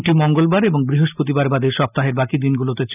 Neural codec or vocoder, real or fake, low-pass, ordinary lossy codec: none; real; 3.6 kHz; none